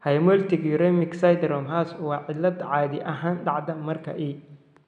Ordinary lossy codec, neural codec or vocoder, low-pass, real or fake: none; none; 9.9 kHz; real